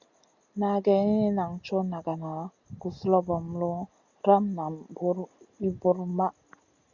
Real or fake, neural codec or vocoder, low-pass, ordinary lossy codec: real; none; 7.2 kHz; Opus, 64 kbps